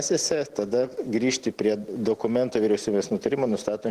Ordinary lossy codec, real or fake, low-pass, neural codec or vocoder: Opus, 16 kbps; real; 14.4 kHz; none